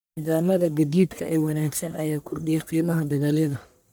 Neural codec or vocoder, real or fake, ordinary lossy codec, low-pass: codec, 44.1 kHz, 1.7 kbps, Pupu-Codec; fake; none; none